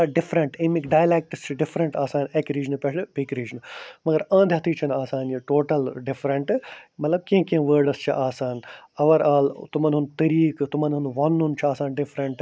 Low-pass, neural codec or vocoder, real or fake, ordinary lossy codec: none; none; real; none